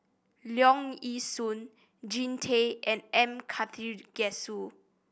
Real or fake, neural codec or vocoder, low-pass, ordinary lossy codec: real; none; none; none